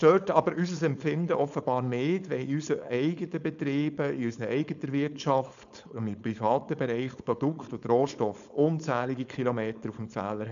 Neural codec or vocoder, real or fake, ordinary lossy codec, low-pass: codec, 16 kHz, 4.8 kbps, FACodec; fake; none; 7.2 kHz